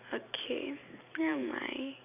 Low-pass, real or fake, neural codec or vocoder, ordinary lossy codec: 3.6 kHz; fake; vocoder, 44.1 kHz, 128 mel bands every 512 samples, BigVGAN v2; none